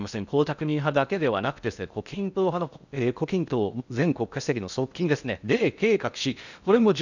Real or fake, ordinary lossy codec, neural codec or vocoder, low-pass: fake; none; codec, 16 kHz in and 24 kHz out, 0.6 kbps, FocalCodec, streaming, 2048 codes; 7.2 kHz